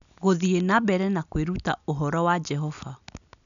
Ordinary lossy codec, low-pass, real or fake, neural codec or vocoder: none; 7.2 kHz; real; none